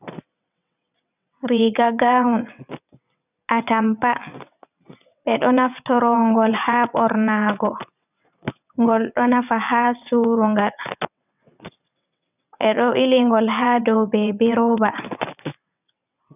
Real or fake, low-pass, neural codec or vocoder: fake; 3.6 kHz; vocoder, 22.05 kHz, 80 mel bands, WaveNeXt